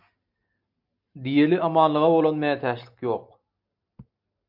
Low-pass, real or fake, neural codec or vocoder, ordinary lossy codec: 5.4 kHz; real; none; MP3, 48 kbps